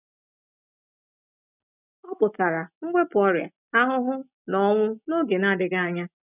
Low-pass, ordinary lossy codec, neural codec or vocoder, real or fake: 3.6 kHz; none; none; real